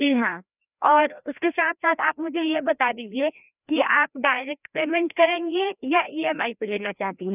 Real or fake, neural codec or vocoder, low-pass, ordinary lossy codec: fake; codec, 16 kHz, 1 kbps, FreqCodec, larger model; 3.6 kHz; none